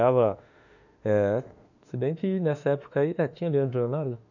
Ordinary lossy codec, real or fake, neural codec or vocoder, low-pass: none; fake; autoencoder, 48 kHz, 32 numbers a frame, DAC-VAE, trained on Japanese speech; 7.2 kHz